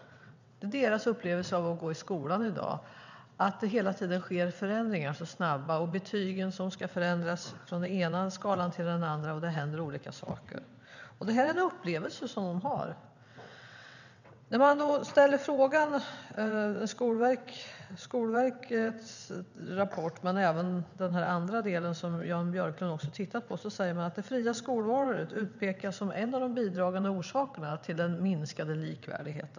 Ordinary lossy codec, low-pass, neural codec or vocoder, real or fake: none; 7.2 kHz; vocoder, 22.05 kHz, 80 mel bands, WaveNeXt; fake